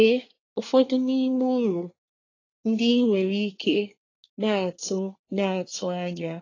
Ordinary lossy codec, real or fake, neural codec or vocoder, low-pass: AAC, 32 kbps; fake; codec, 24 kHz, 1 kbps, SNAC; 7.2 kHz